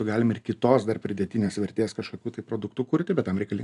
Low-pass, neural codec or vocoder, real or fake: 10.8 kHz; none; real